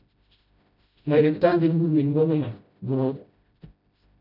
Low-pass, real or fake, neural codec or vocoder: 5.4 kHz; fake; codec, 16 kHz, 0.5 kbps, FreqCodec, smaller model